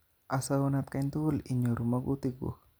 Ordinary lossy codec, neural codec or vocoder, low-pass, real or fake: none; none; none; real